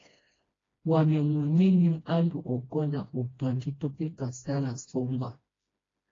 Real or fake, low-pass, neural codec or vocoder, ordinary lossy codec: fake; 7.2 kHz; codec, 16 kHz, 1 kbps, FreqCodec, smaller model; AAC, 32 kbps